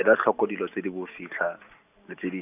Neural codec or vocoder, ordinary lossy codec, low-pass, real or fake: none; none; 3.6 kHz; real